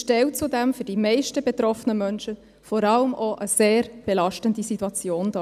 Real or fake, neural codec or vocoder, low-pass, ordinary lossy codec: real; none; 14.4 kHz; none